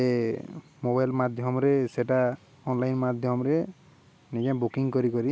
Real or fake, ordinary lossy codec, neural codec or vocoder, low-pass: real; none; none; none